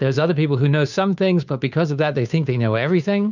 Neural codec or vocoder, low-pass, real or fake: codec, 16 kHz, 2 kbps, FunCodec, trained on Chinese and English, 25 frames a second; 7.2 kHz; fake